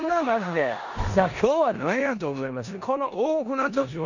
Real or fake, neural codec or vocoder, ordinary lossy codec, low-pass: fake; codec, 16 kHz in and 24 kHz out, 0.9 kbps, LongCat-Audio-Codec, four codebook decoder; none; 7.2 kHz